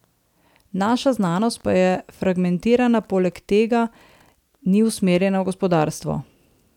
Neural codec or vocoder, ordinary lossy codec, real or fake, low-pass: none; none; real; 19.8 kHz